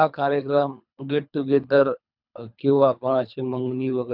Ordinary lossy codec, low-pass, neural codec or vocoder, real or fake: none; 5.4 kHz; codec, 24 kHz, 3 kbps, HILCodec; fake